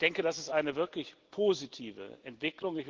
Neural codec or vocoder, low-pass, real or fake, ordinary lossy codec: none; 7.2 kHz; real; Opus, 16 kbps